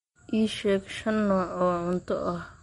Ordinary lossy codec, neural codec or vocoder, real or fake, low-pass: MP3, 48 kbps; none; real; 19.8 kHz